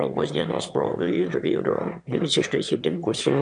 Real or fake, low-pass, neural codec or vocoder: fake; 9.9 kHz; autoencoder, 22.05 kHz, a latent of 192 numbers a frame, VITS, trained on one speaker